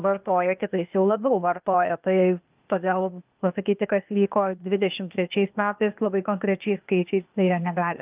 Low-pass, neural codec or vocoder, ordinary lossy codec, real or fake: 3.6 kHz; codec, 16 kHz, 0.8 kbps, ZipCodec; Opus, 24 kbps; fake